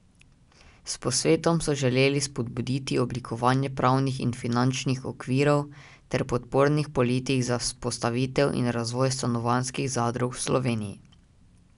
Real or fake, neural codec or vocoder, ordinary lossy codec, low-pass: real; none; none; 10.8 kHz